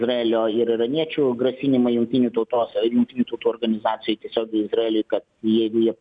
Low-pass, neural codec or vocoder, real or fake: 9.9 kHz; none; real